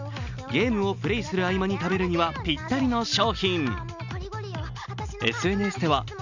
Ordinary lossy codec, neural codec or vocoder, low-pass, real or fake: none; none; 7.2 kHz; real